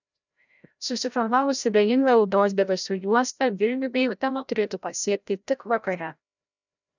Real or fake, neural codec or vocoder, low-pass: fake; codec, 16 kHz, 0.5 kbps, FreqCodec, larger model; 7.2 kHz